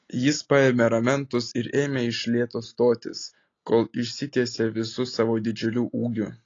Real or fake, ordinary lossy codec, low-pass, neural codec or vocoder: real; AAC, 32 kbps; 7.2 kHz; none